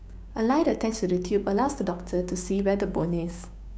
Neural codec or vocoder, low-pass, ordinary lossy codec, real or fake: codec, 16 kHz, 6 kbps, DAC; none; none; fake